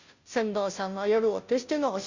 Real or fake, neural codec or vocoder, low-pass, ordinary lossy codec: fake; codec, 16 kHz, 0.5 kbps, FunCodec, trained on Chinese and English, 25 frames a second; 7.2 kHz; Opus, 64 kbps